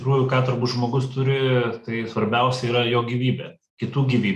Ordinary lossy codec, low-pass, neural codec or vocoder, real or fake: MP3, 96 kbps; 14.4 kHz; none; real